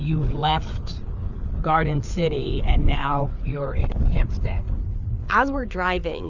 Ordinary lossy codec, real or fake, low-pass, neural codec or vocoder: Opus, 64 kbps; fake; 7.2 kHz; codec, 16 kHz, 4 kbps, FreqCodec, larger model